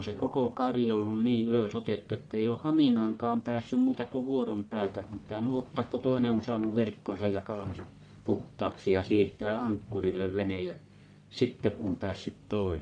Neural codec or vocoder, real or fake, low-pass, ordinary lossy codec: codec, 44.1 kHz, 1.7 kbps, Pupu-Codec; fake; 9.9 kHz; none